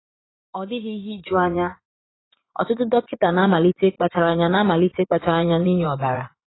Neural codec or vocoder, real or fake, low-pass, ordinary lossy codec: vocoder, 24 kHz, 100 mel bands, Vocos; fake; 7.2 kHz; AAC, 16 kbps